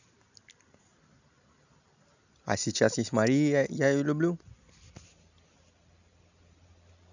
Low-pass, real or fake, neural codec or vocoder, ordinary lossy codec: 7.2 kHz; real; none; none